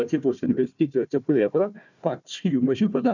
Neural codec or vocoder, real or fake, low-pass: codec, 16 kHz, 1 kbps, FunCodec, trained on Chinese and English, 50 frames a second; fake; 7.2 kHz